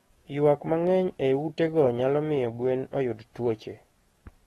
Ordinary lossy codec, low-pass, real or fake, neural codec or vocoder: AAC, 32 kbps; 19.8 kHz; fake; codec, 44.1 kHz, 7.8 kbps, DAC